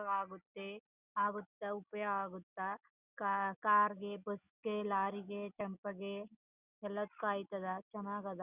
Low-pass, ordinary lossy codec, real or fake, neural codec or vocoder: 3.6 kHz; Opus, 64 kbps; real; none